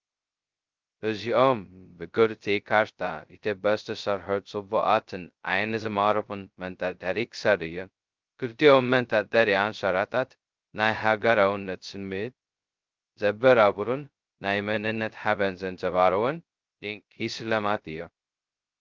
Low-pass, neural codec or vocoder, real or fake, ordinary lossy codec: 7.2 kHz; codec, 16 kHz, 0.2 kbps, FocalCodec; fake; Opus, 24 kbps